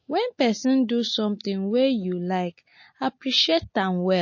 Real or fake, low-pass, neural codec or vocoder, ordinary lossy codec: real; 7.2 kHz; none; MP3, 32 kbps